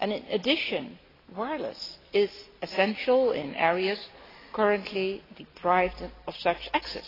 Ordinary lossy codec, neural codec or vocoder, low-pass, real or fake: AAC, 24 kbps; none; 5.4 kHz; real